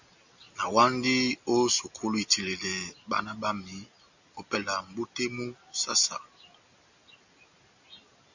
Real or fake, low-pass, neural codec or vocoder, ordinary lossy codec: real; 7.2 kHz; none; Opus, 64 kbps